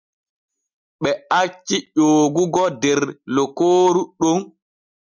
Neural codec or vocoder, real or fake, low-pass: none; real; 7.2 kHz